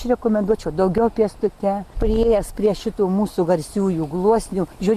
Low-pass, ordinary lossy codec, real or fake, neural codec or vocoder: 14.4 kHz; Opus, 64 kbps; real; none